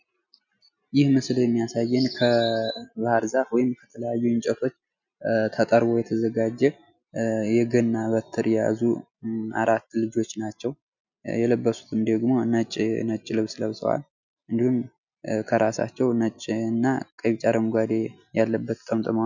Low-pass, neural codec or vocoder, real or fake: 7.2 kHz; none; real